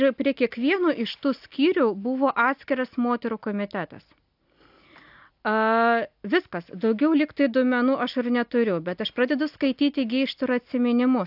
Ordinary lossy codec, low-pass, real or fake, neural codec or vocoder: AAC, 48 kbps; 5.4 kHz; real; none